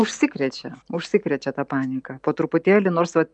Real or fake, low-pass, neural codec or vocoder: real; 10.8 kHz; none